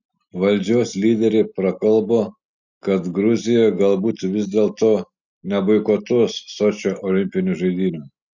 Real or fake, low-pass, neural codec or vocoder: real; 7.2 kHz; none